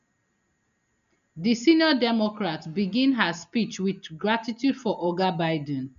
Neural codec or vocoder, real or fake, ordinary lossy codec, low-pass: none; real; none; 7.2 kHz